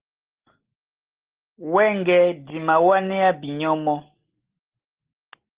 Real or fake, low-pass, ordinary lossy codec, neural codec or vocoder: real; 3.6 kHz; Opus, 16 kbps; none